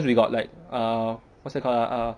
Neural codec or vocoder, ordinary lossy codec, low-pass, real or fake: none; none; 9.9 kHz; real